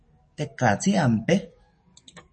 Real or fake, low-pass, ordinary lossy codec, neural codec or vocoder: fake; 10.8 kHz; MP3, 32 kbps; codec, 44.1 kHz, 7.8 kbps, DAC